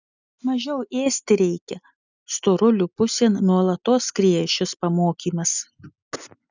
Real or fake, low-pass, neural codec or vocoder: real; 7.2 kHz; none